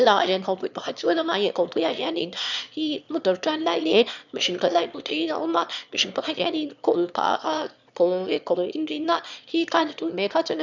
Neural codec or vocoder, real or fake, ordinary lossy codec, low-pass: autoencoder, 22.05 kHz, a latent of 192 numbers a frame, VITS, trained on one speaker; fake; none; 7.2 kHz